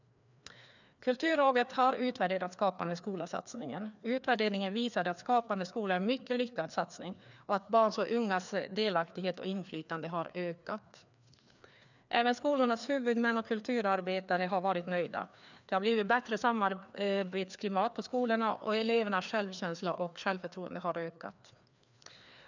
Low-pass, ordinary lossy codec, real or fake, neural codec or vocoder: 7.2 kHz; none; fake; codec, 16 kHz, 2 kbps, FreqCodec, larger model